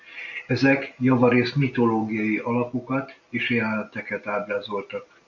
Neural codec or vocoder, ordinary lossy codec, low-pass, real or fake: none; MP3, 64 kbps; 7.2 kHz; real